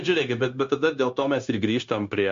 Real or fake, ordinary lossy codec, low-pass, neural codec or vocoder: fake; MP3, 48 kbps; 7.2 kHz; codec, 16 kHz, 0.9 kbps, LongCat-Audio-Codec